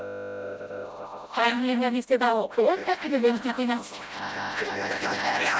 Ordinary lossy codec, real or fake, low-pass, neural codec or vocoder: none; fake; none; codec, 16 kHz, 0.5 kbps, FreqCodec, smaller model